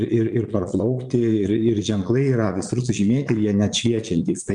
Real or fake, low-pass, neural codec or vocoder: fake; 9.9 kHz; vocoder, 22.05 kHz, 80 mel bands, Vocos